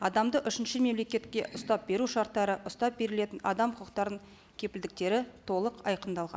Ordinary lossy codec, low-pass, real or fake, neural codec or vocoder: none; none; real; none